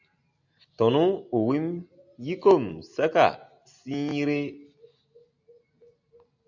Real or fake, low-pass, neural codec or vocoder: real; 7.2 kHz; none